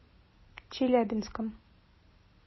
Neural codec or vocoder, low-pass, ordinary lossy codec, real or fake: none; 7.2 kHz; MP3, 24 kbps; real